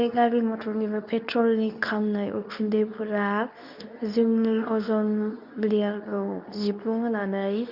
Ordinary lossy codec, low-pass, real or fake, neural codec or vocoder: none; 5.4 kHz; fake; codec, 24 kHz, 0.9 kbps, WavTokenizer, medium speech release version 2